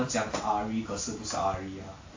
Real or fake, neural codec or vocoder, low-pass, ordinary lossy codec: real; none; 7.2 kHz; AAC, 48 kbps